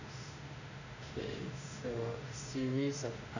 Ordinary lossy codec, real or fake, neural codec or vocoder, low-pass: none; fake; autoencoder, 48 kHz, 32 numbers a frame, DAC-VAE, trained on Japanese speech; 7.2 kHz